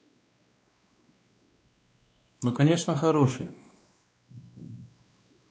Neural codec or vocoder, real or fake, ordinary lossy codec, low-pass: codec, 16 kHz, 2 kbps, X-Codec, WavLM features, trained on Multilingual LibriSpeech; fake; none; none